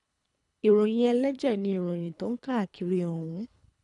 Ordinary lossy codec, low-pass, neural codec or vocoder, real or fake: none; 10.8 kHz; codec, 24 kHz, 3 kbps, HILCodec; fake